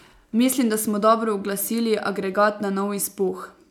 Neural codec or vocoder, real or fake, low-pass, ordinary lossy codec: none; real; 19.8 kHz; none